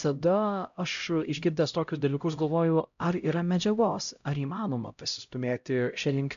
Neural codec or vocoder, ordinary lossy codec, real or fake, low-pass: codec, 16 kHz, 0.5 kbps, X-Codec, HuBERT features, trained on LibriSpeech; AAC, 96 kbps; fake; 7.2 kHz